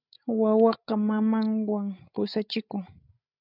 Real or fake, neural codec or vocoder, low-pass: fake; codec, 16 kHz, 16 kbps, FreqCodec, larger model; 5.4 kHz